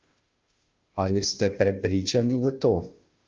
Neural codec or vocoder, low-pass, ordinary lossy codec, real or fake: codec, 16 kHz, 0.5 kbps, FunCodec, trained on Chinese and English, 25 frames a second; 7.2 kHz; Opus, 24 kbps; fake